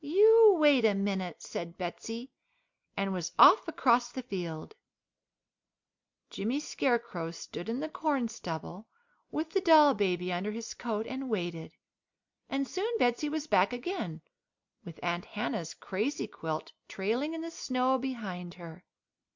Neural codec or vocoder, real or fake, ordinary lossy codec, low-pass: none; real; MP3, 64 kbps; 7.2 kHz